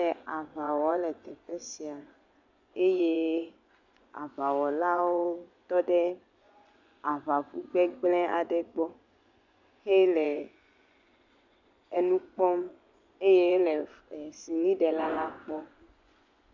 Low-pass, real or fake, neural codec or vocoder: 7.2 kHz; fake; vocoder, 24 kHz, 100 mel bands, Vocos